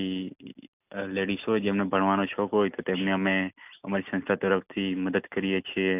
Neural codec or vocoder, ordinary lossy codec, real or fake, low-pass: none; none; real; 3.6 kHz